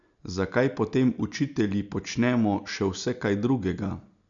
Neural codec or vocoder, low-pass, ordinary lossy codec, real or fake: none; 7.2 kHz; none; real